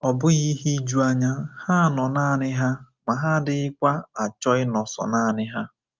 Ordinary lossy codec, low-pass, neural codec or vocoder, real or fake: Opus, 24 kbps; 7.2 kHz; none; real